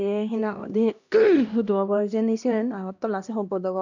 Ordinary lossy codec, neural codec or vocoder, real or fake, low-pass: none; codec, 16 kHz, 1 kbps, X-Codec, HuBERT features, trained on LibriSpeech; fake; 7.2 kHz